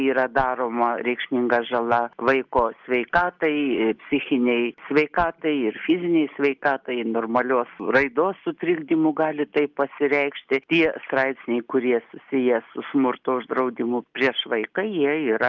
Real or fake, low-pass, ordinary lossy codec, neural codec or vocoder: real; 7.2 kHz; Opus, 24 kbps; none